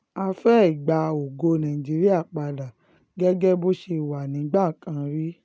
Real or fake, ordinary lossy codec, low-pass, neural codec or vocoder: real; none; none; none